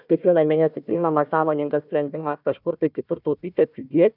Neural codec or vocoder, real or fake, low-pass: codec, 16 kHz, 1 kbps, FunCodec, trained on Chinese and English, 50 frames a second; fake; 5.4 kHz